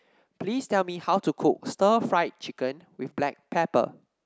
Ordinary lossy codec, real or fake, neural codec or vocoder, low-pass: none; real; none; none